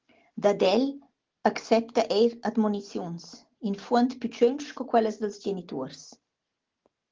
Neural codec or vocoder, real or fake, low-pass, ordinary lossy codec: none; real; 7.2 kHz; Opus, 16 kbps